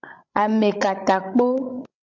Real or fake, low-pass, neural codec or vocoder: fake; 7.2 kHz; codec, 16 kHz, 16 kbps, FreqCodec, larger model